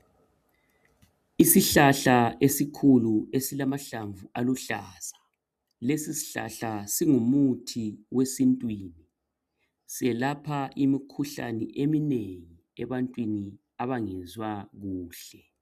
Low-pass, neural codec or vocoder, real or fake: 14.4 kHz; none; real